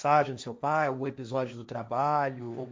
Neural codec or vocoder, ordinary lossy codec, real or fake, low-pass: codec, 16 kHz, 1.1 kbps, Voila-Tokenizer; none; fake; none